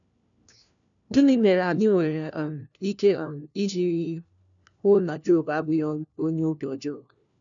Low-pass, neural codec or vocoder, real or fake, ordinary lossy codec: 7.2 kHz; codec, 16 kHz, 1 kbps, FunCodec, trained on LibriTTS, 50 frames a second; fake; none